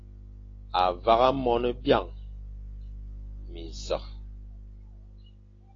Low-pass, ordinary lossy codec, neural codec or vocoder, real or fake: 7.2 kHz; AAC, 32 kbps; none; real